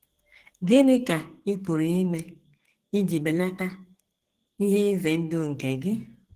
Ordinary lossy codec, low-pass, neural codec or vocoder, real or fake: Opus, 16 kbps; 14.4 kHz; codec, 44.1 kHz, 2.6 kbps, SNAC; fake